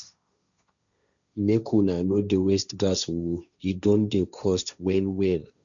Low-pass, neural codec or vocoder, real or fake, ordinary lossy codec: 7.2 kHz; codec, 16 kHz, 1.1 kbps, Voila-Tokenizer; fake; none